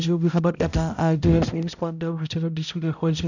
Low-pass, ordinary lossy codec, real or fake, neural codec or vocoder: 7.2 kHz; none; fake; codec, 16 kHz, 0.5 kbps, X-Codec, HuBERT features, trained on balanced general audio